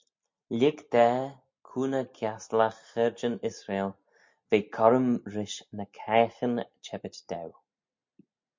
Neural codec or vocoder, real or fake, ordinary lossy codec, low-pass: none; real; MP3, 48 kbps; 7.2 kHz